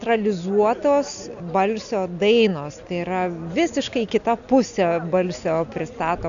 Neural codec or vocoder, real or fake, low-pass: none; real; 7.2 kHz